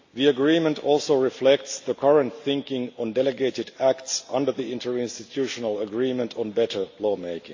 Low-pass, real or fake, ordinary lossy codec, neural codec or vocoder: 7.2 kHz; real; AAC, 48 kbps; none